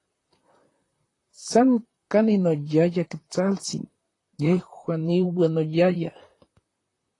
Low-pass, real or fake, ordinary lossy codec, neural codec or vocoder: 10.8 kHz; fake; AAC, 32 kbps; vocoder, 44.1 kHz, 128 mel bands, Pupu-Vocoder